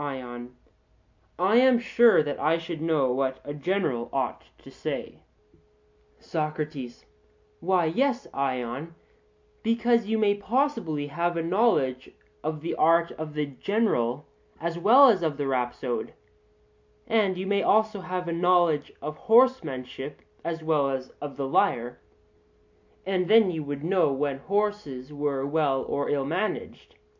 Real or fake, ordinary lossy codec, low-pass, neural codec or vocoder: real; MP3, 48 kbps; 7.2 kHz; none